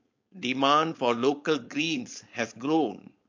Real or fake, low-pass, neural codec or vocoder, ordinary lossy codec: fake; 7.2 kHz; codec, 16 kHz, 4.8 kbps, FACodec; MP3, 48 kbps